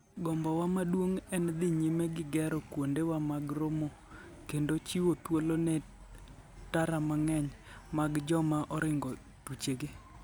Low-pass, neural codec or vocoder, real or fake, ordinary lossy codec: none; none; real; none